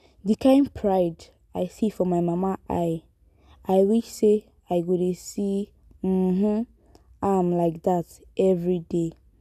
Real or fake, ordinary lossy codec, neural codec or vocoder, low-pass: real; none; none; 14.4 kHz